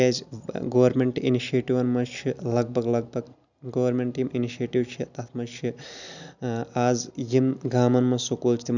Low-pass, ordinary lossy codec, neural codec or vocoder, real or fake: 7.2 kHz; none; none; real